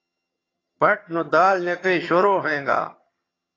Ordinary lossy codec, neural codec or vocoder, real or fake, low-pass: AAC, 32 kbps; vocoder, 22.05 kHz, 80 mel bands, HiFi-GAN; fake; 7.2 kHz